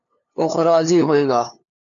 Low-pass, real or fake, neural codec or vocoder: 7.2 kHz; fake; codec, 16 kHz, 2 kbps, FunCodec, trained on LibriTTS, 25 frames a second